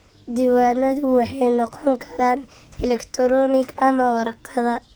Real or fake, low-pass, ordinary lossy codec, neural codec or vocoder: fake; none; none; codec, 44.1 kHz, 2.6 kbps, SNAC